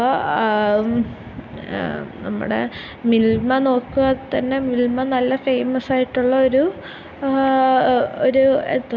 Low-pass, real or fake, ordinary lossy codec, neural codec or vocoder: none; real; none; none